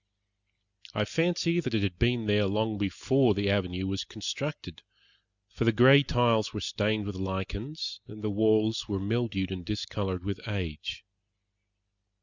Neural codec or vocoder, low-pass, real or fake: none; 7.2 kHz; real